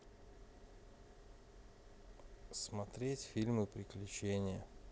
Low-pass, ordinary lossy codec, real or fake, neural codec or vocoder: none; none; real; none